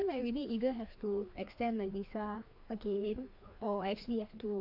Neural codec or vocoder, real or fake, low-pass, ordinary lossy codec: codec, 16 kHz, 2 kbps, FreqCodec, larger model; fake; 5.4 kHz; AAC, 48 kbps